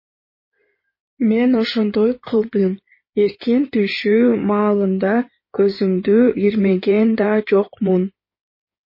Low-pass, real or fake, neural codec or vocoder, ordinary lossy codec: 5.4 kHz; fake; codec, 16 kHz in and 24 kHz out, 2.2 kbps, FireRedTTS-2 codec; MP3, 24 kbps